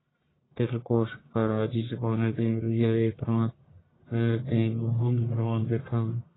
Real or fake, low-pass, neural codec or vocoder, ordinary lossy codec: fake; 7.2 kHz; codec, 44.1 kHz, 1.7 kbps, Pupu-Codec; AAC, 16 kbps